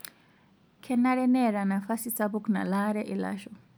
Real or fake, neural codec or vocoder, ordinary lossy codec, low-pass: real; none; none; none